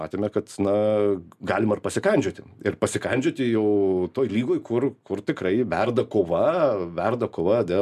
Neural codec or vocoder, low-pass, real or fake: none; 14.4 kHz; real